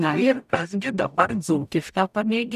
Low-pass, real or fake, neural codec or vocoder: 14.4 kHz; fake; codec, 44.1 kHz, 0.9 kbps, DAC